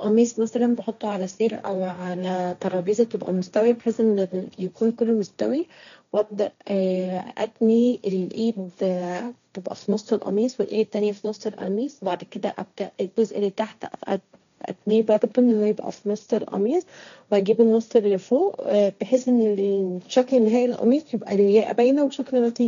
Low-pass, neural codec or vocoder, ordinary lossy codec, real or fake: 7.2 kHz; codec, 16 kHz, 1.1 kbps, Voila-Tokenizer; none; fake